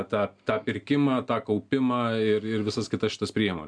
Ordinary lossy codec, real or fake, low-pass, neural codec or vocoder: MP3, 96 kbps; real; 9.9 kHz; none